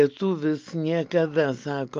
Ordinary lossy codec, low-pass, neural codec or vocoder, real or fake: Opus, 24 kbps; 7.2 kHz; none; real